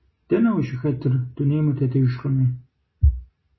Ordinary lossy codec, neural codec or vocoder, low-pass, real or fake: MP3, 24 kbps; none; 7.2 kHz; real